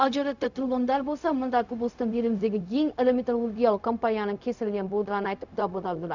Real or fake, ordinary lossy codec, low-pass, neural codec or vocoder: fake; none; 7.2 kHz; codec, 16 kHz, 0.4 kbps, LongCat-Audio-Codec